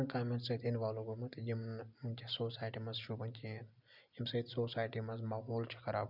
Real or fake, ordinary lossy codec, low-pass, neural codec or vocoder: real; none; 5.4 kHz; none